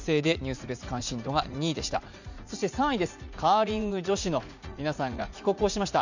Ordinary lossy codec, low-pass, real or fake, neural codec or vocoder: none; 7.2 kHz; fake; vocoder, 44.1 kHz, 80 mel bands, Vocos